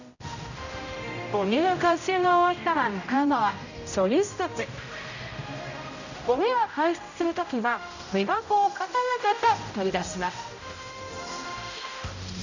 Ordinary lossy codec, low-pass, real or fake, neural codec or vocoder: none; 7.2 kHz; fake; codec, 16 kHz, 0.5 kbps, X-Codec, HuBERT features, trained on general audio